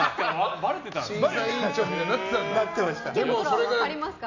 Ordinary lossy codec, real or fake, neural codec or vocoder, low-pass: none; real; none; 7.2 kHz